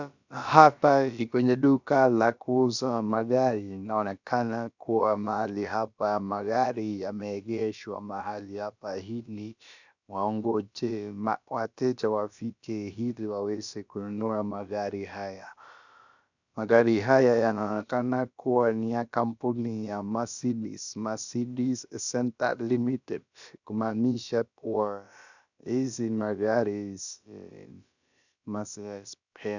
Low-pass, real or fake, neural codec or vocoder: 7.2 kHz; fake; codec, 16 kHz, about 1 kbps, DyCAST, with the encoder's durations